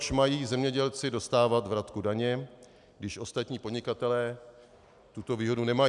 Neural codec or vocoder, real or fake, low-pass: none; real; 10.8 kHz